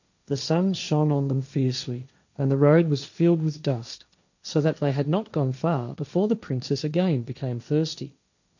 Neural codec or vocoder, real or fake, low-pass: codec, 16 kHz, 1.1 kbps, Voila-Tokenizer; fake; 7.2 kHz